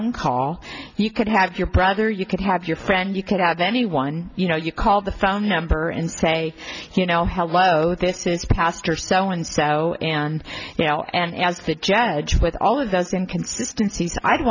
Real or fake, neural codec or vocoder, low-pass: real; none; 7.2 kHz